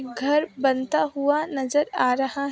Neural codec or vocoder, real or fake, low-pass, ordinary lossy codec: none; real; none; none